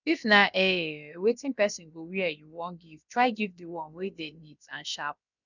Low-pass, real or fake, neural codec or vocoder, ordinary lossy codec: 7.2 kHz; fake; codec, 16 kHz, about 1 kbps, DyCAST, with the encoder's durations; none